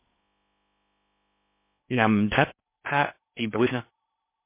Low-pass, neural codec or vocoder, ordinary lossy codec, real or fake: 3.6 kHz; codec, 16 kHz in and 24 kHz out, 0.6 kbps, FocalCodec, streaming, 4096 codes; MP3, 24 kbps; fake